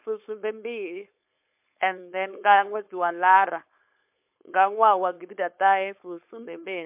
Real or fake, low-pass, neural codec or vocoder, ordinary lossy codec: fake; 3.6 kHz; codec, 16 kHz, 4.8 kbps, FACodec; MP3, 32 kbps